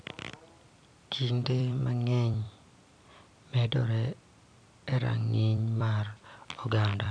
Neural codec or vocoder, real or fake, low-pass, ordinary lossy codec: vocoder, 44.1 kHz, 128 mel bands every 256 samples, BigVGAN v2; fake; 9.9 kHz; none